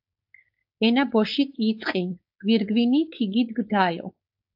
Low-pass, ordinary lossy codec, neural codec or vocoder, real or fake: 5.4 kHz; AAC, 48 kbps; codec, 16 kHz, 4.8 kbps, FACodec; fake